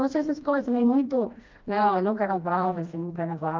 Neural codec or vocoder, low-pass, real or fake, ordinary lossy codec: codec, 16 kHz, 1 kbps, FreqCodec, smaller model; 7.2 kHz; fake; Opus, 24 kbps